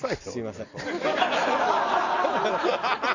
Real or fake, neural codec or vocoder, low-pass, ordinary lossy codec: real; none; 7.2 kHz; none